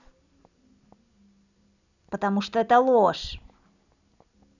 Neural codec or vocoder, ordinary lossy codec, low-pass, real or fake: none; none; 7.2 kHz; real